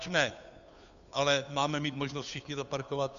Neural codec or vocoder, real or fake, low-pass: codec, 16 kHz, 2 kbps, FunCodec, trained on Chinese and English, 25 frames a second; fake; 7.2 kHz